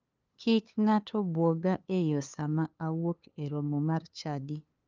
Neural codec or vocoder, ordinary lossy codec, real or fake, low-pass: codec, 16 kHz, 2 kbps, FunCodec, trained on LibriTTS, 25 frames a second; Opus, 24 kbps; fake; 7.2 kHz